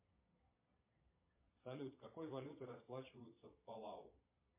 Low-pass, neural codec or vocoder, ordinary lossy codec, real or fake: 3.6 kHz; vocoder, 22.05 kHz, 80 mel bands, Vocos; MP3, 24 kbps; fake